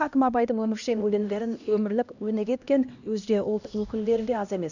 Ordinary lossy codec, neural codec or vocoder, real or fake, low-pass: none; codec, 16 kHz, 1 kbps, X-Codec, HuBERT features, trained on LibriSpeech; fake; 7.2 kHz